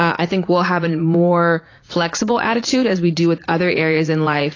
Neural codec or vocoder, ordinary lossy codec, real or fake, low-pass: vocoder, 44.1 kHz, 80 mel bands, Vocos; AAC, 32 kbps; fake; 7.2 kHz